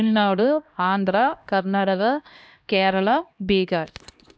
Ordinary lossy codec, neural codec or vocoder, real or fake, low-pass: none; codec, 16 kHz, 1 kbps, X-Codec, HuBERT features, trained on LibriSpeech; fake; none